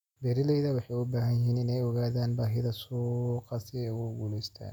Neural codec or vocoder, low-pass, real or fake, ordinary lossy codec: none; 19.8 kHz; real; none